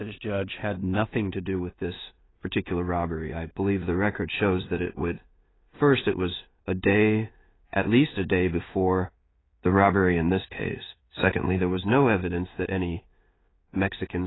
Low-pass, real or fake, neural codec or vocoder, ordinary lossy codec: 7.2 kHz; fake; codec, 16 kHz in and 24 kHz out, 0.4 kbps, LongCat-Audio-Codec, two codebook decoder; AAC, 16 kbps